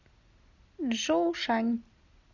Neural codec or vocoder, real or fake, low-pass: none; real; 7.2 kHz